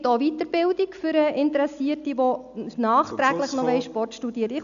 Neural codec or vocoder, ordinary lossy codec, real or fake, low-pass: none; none; real; 7.2 kHz